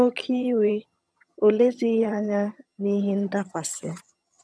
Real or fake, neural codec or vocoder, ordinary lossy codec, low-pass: fake; vocoder, 22.05 kHz, 80 mel bands, HiFi-GAN; none; none